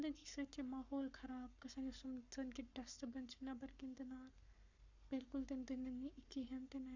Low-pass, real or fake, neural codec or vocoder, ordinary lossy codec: 7.2 kHz; fake; codec, 44.1 kHz, 7.8 kbps, Pupu-Codec; none